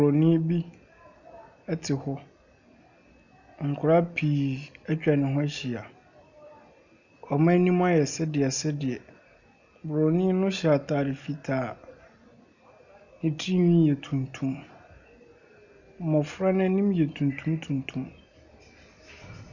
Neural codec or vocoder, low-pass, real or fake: none; 7.2 kHz; real